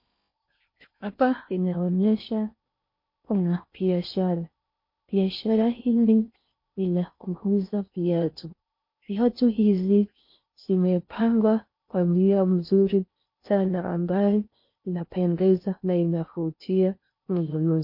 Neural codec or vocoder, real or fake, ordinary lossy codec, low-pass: codec, 16 kHz in and 24 kHz out, 0.6 kbps, FocalCodec, streaming, 4096 codes; fake; MP3, 32 kbps; 5.4 kHz